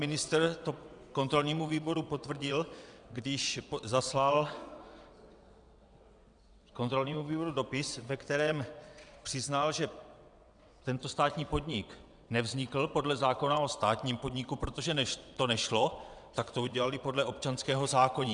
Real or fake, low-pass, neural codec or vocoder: fake; 9.9 kHz; vocoder, 22.05 kHz, 80 mel bands, WaveNeXt